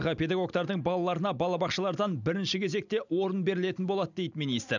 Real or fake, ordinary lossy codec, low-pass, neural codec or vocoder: real; none; 7.2 kHz; none